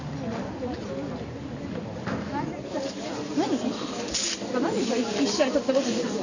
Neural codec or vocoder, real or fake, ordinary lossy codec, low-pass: none; real; none; 7.2 kHz